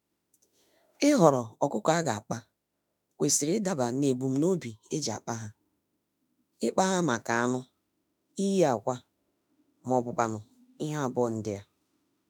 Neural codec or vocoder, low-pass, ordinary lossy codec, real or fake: autoencoder, 48 kHz, 32 numbers a frame, DAC-VAE, trained on Japanese speech; none; none; fake